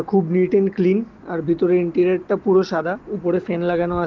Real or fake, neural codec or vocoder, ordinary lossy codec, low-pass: real; none; Opus, 16 kbps; 7.2 kHz